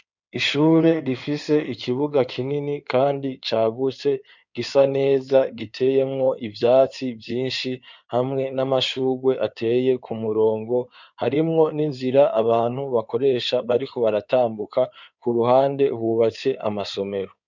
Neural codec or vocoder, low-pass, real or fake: codec, 16 kHz in and 24 kHz out, 2.2 kbps, FireRedTTS-2 codec; 7.2 kHz; fake